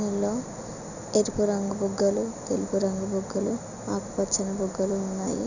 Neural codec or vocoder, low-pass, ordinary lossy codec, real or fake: none; 7.2 kHz; none; real